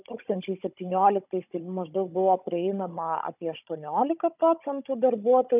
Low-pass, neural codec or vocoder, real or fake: 3.6 kHz; codec, 16 kHz, 16 kbps, FunCodec, trained on Chinese and English, 50 frames a second; fake